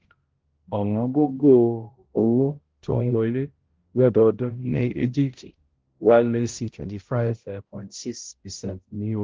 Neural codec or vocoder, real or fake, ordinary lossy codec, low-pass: codec, 16 kHz, 0.5 kbps, X-Codec, HuBERT features, trained on balanced general audio; fake; Opus, 16 kbps; 7.2 kHz